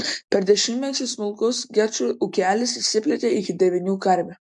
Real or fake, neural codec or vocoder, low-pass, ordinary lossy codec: real; none; 10.8 kHz; MP3, 64 kbps